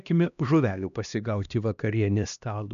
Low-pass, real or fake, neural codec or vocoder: 7.2 kHz; fake; codec, 16 kHz, 1 kbps, X-Codec, HuBERT features, trained on LibriSpeech